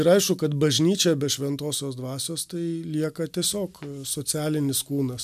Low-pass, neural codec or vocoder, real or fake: 14.4 kHz; none; real